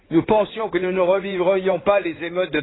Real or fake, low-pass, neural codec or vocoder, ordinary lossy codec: fake; 7.2 kHz; codec, 16 kHz in and 24 kHz out, 2.2 kbps, FireRedTTS-2 codec; AAC, 16 kbps